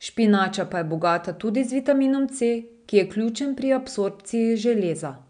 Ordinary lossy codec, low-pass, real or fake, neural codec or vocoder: none; 9.9 kHz; real; none